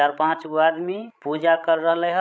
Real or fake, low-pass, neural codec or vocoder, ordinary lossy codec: fake; none; codec, 16 kHz, 16 kbps, FreqCodec, larger model; none